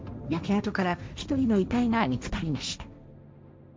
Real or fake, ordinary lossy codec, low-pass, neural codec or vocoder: fake; none; none; codec, 16 kHz, 1.1 kbps, Voila-Tokenizer